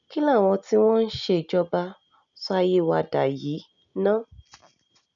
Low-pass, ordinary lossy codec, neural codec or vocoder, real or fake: 7.2 kHz; none; none; real